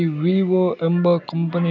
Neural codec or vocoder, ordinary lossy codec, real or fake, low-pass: none; none; real; 7.2 kHz